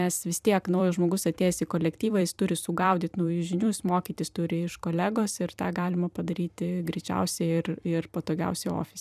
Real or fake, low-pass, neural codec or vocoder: fake; 14.4 kHz; vocoder, 44.1 kHz, 128 mel bands every 256 samples, BigVGAN v2